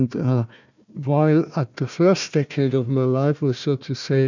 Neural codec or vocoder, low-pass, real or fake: codec, 16 kHz, 1 kbps, FunCodec, trained on Chinese and English, 50 frames a second; 7.2 kHz; fake